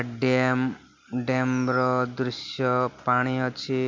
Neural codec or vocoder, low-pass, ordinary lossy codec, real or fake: none; 7.2 kHz; MP3, 48 kbps; real